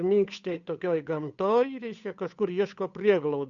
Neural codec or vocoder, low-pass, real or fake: codec, 16 kHz, 8 kbps, FunCodec, trained on Chinese and English, 25 frames a second; 7.2 kHz; fake